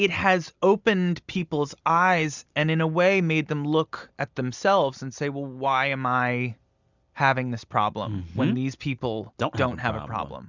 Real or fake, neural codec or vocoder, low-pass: real; none; 7.2 kHz